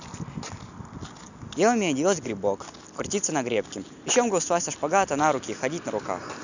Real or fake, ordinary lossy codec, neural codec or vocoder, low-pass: real; none; none; 7.2 kHz